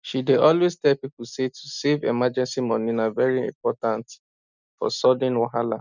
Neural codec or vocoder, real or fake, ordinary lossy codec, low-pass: none; real; none; 7.2 kHz